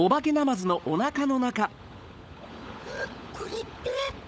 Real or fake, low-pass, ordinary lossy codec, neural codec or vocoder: fake; none; none; codec, 16 kHz, 16 kbps, FunCodec, trained on LibriTTS, 50 frames a second